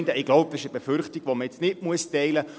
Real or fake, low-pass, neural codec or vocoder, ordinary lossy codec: real; none; none; none